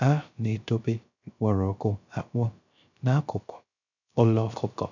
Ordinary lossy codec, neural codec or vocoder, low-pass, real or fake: none; codec, 16 kHz, 0.3 kbps, FocalCodec; 7.2 kHz; fake